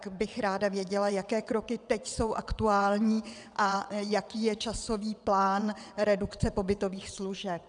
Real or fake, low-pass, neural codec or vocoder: fake; 9.9 kHz; vocoder, 22.05 kHz, 80 mel bands, WaveNeXt